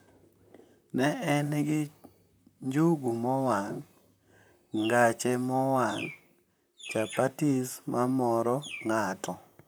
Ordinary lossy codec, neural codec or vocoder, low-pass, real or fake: none; vocoder, 44.1 kHz, 128 mel bands, Pupu-Vocoder; none; fake